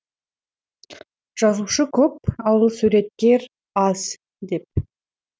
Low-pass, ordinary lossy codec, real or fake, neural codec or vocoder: none; none; real; none